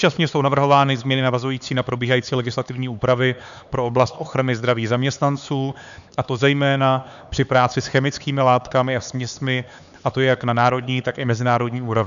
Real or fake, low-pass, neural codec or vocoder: fake; 7.2 kHz; codec, 16 kHz, 4 kbps, X-Codec, HuBERT features, trained on LibriSpeech